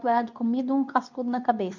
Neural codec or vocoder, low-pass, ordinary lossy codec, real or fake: codec, 24 kHz, 0.9 kbps, WavTokenizer, medium speech release version 2; 7.2 kHz; none; fake